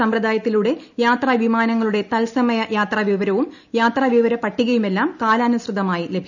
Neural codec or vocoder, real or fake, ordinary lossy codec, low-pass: none; real; none; 7.2 kHz